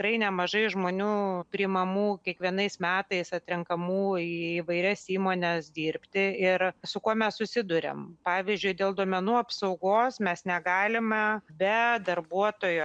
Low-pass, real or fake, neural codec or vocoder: 9.9 kHz; real; none